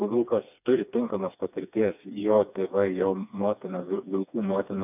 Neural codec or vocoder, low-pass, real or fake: codec, 16 kHz, 2 kbps, FreqCodec, smaller model; 3.6 kHz; fake